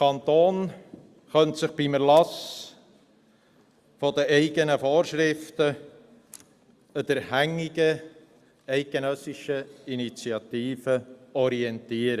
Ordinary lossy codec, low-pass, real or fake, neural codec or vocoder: Opus, 64 kbps; 14.4 kHz; real; none